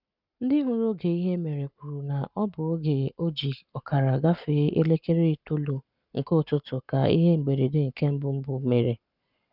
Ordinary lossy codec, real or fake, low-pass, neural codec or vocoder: none; fake; 5.4 kHz; codec, 44.1 kHz, 7.8 kbps, Pupu-Codec